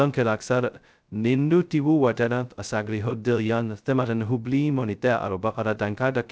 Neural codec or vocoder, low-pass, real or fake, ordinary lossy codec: codec, 16 kHz, 0.2 kbps, FocalCodec; none; fake; none